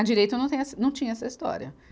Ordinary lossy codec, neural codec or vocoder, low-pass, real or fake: none; none; none; real